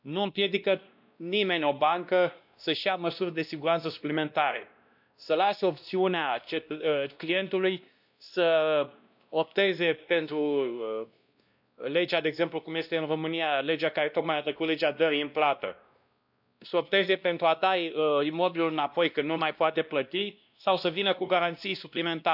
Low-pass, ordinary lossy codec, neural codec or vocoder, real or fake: 5.4 kHz; none; codec, 16 kHz, 1 kbps, X-Codec, WavLM features, trained on Multilingual LibriSpeech; fake